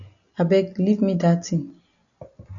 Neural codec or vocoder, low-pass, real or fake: none; 7.2 kHz; real